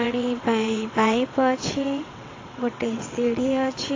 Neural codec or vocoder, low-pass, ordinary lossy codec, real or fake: vocoder, 22.05 kHz, 80 mel bands, WaveNeXt; 7.2 kHz; AAC, 32 kbps; fake